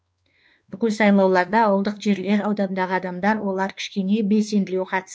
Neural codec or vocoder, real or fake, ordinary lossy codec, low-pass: codec, 16 kHz, 2 kbps, X-Codec, WavLM features, trained on Multilingual LibriSpeech; fake; none; none